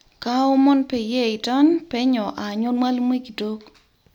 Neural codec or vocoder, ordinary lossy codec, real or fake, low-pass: none; none; real; 19.8 kHz